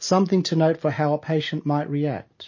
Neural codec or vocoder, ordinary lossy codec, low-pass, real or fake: none; MP3, 32 kbps; 7.2 kHz; real